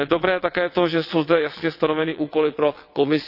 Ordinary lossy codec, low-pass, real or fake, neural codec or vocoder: none; 5.4 kHz; fake; vocoder, 22.05 kHz, 80 mel bands, WaveNeXt